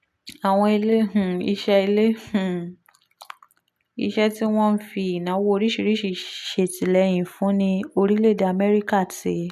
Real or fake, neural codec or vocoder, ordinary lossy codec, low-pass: real; none; none; 14.4 kHz